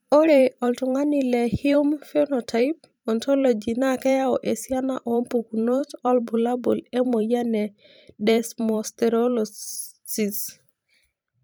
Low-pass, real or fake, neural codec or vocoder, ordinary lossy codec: none; fake; vocoder, 44.1 kHz, 128 mel bands every 512 samples, BigVGAN v2; none